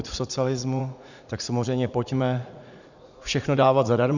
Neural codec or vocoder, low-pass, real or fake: vocoder, 44.1 kHz, 80 mel bands, Vocos; 7.2 kHz; fake